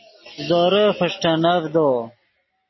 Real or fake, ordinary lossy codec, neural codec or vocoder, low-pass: real; MP3, 24 kbps; none; 7.2 kHz